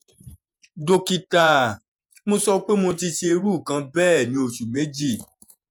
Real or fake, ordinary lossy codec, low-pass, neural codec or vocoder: fake; none; none; vocoder, 48 kHz, 128 mel bands, Vocos